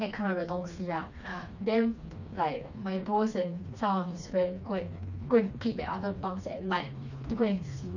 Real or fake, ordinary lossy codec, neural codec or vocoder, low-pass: fake; none; codec, 16 kHz, 2 kbps, FreqCodec, smaller model; 7.2 kHz